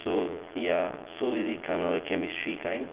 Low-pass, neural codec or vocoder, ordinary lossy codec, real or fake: 3.6 kHz; vocoder, 22.05 kHz, 80 mel bands, Vocos; Opus, 16 kbps; fake